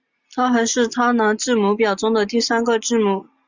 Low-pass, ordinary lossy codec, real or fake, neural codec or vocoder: 7.2 kHz; Opus, 64 kbps; real; none